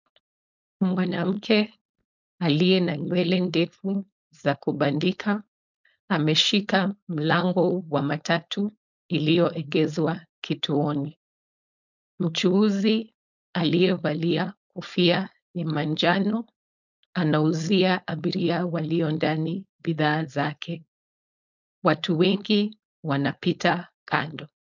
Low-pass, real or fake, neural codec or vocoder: 7.2 kHz; fake; codec, 16 kHz, 4.8 kbps, FACodec